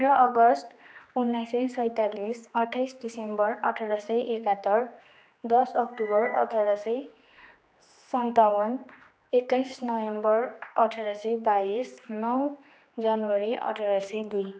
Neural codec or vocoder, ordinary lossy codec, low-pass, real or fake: codec, 16 kHz, 2 kbps, X-Codec, HuBERT features, trained on general audio; none; none; fake